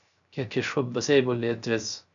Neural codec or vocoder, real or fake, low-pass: codec, 16 kHz, 0.3 kbps, FocalCodec; fake; 7.2 kHz